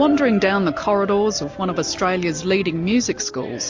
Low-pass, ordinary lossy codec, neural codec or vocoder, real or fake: 7.2 kHz; MP3, 48 kbps; none; real